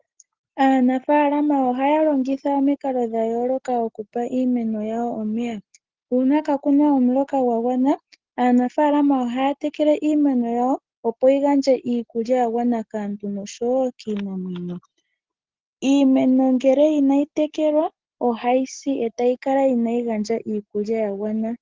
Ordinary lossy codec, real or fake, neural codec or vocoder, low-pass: Opus, 16 kbps; real; none; 7.2 kHz